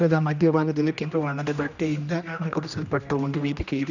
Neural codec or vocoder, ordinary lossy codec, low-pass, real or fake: codec, 16 kHz, 1 kbps, X-Codec, HuBERT features, trained on general audio; none; 7.2 kHz; fake